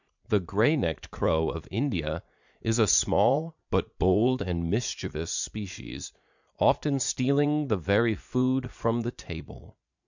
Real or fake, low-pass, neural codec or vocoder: fake; 7.2 kHz; vocoder, 44.1 kHz, 80 mel bands, Vocos